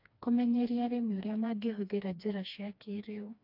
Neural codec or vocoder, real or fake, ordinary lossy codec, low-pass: codec, 16 kHz, 2 kbps, FreqCodec, smaller model; fake; AAC, 48 kbps; 5.4 kHz